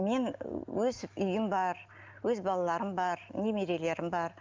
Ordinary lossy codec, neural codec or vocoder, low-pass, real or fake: Opus, 32 kbps; none; 7.2 kHz; real